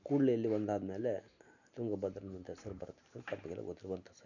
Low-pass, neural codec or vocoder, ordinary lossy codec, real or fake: 7.2 kHz; none; none; real